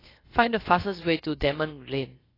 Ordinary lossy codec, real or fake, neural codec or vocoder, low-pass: AAC, 24 kbps; fake; codec, 16 kHz, about 1 kbps, DyCAST, with the encoder's durations; 5.4 kHz